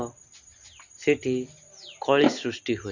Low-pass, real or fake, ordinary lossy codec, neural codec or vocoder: 7.2 kHz; real; Opus, 64 kbps; none